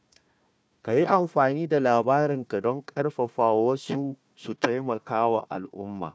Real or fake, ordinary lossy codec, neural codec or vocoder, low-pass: fake; none; codec, 16 kHz, 1 kbps, FunCodec, trained on Chinese and English, 50 frames a second; none